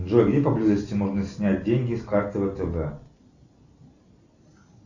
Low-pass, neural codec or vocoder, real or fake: 7.2 kHz; none; real